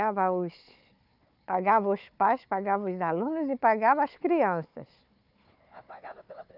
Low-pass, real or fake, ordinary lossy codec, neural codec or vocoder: 5.4 kHz; fake; none; codec, 16 kHz, 4 kbps, FunCodec, trained on Chinese and English, 50 frames a second